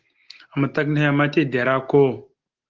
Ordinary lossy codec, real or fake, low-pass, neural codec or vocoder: Opus, 16 kbps; real; 7.2 kHz; none